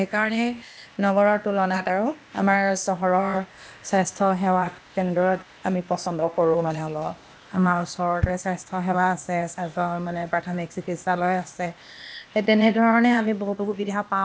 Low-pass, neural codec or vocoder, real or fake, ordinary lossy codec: none; codec, 16 kHz, 0.8 kbps, ZipCodec; fake; none